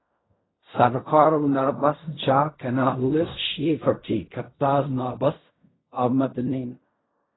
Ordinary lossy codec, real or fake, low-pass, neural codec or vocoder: AAC, 16 kbps; fake; 7.2 kHz; codec, 16 kHz in and 24 kHz out, 0.4 kbps, LongCat-Audio-Codec, fine tuned four codebook decoder